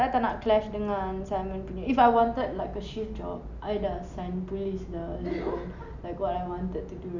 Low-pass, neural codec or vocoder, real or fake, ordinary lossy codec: 7.2 kHz; none; real; none